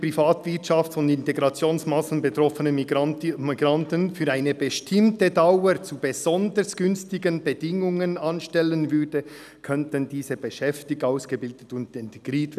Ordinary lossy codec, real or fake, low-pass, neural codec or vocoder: none; real; 14.4 kHz; none